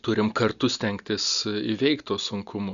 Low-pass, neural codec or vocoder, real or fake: 7.2 kHz; none; real